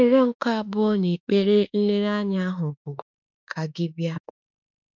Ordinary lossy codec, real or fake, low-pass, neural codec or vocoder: none; fake; 7.2 kHz; autoencoder, 48 kHz, 32 numbers a frame, DAC-VAE, trained on Japanese speech